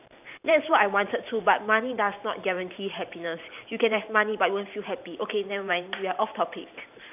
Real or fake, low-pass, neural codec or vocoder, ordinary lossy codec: fake; 3.6 kHz; vocoder, 44.1 kHz, 128 mel bands every 512 samples, BigVGAN v2; none